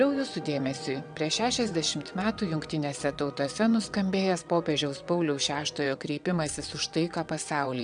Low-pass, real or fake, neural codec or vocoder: 9.9 kHz; fake; vocoder, 22.05 kHz, 80 mel bands, WaveNeXt